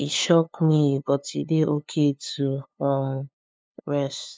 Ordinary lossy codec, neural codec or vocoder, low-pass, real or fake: none; codec, 16 kHz, 2 kbps, FunCodec, trained on LibriTTS, 25 frames a second; none; fake